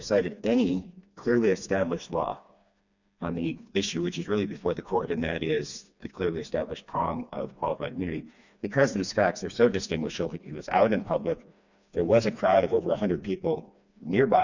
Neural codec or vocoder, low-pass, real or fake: codec, 16 kHz, 2 kbps, FreqCodec, smaller model; 7.2 kHz; fake